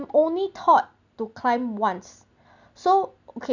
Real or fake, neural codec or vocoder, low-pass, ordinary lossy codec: real; none; 7.2 kHz; none